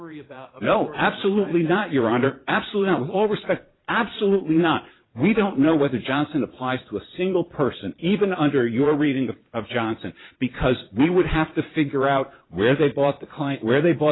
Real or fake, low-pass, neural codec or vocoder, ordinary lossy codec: fake; 7.2 kHz; vocoder, 22.05 kHz, 80 mel bands, Vocos; AAC, 16 kbps